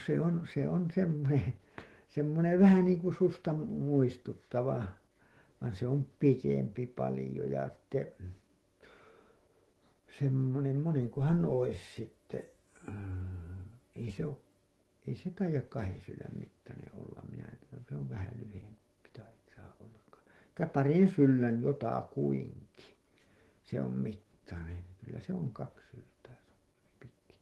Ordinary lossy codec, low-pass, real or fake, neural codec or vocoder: Opus, 16 kbps; 19.8 kHz; fake; autoencoder, 48 kHz, 128 numbers a frame, DAC-VAE, trained on Japanese speech